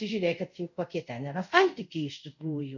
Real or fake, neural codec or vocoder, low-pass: fake; codec, 24 kHz, 0.5 kbps, DualCodec; 7.2 kHz